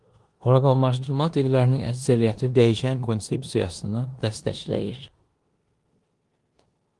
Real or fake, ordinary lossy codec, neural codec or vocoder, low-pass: fake; Opus, 24 kbps; codec, 16 kHz in and 24 kHz out, 0.9 kbps, LongCat-Audio-Codec, four codebook decoder; 10.8 kHz